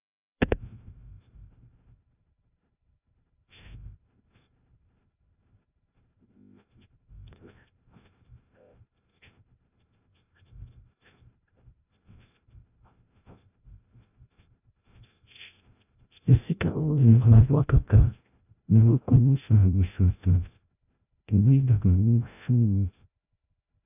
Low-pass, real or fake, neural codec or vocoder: 3.6 kHz; fake; codec, 16 kHz, 0.5 kbps, FreqCodec, larger model